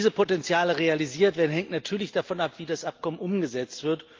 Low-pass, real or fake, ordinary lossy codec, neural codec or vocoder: 7.2 kHz; real; Opus, 32 kbps; none